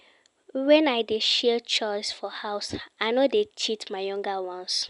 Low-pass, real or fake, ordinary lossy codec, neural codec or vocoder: 10.8 kHz; real; none; none